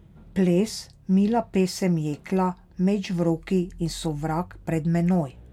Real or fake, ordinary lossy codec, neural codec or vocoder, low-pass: real; MP3, 96 kbps; none; 19.8 kHz